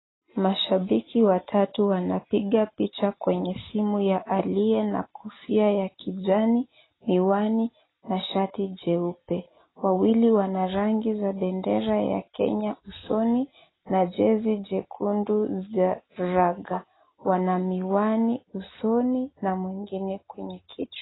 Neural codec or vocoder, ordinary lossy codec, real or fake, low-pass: none; AAC, 16 kbps; real; 7.2 kHz